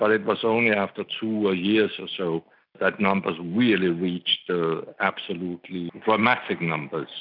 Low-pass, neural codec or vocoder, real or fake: 5.4 kHz; none; real